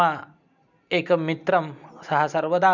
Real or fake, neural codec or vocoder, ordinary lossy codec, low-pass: real; none; none; 7.2 kHz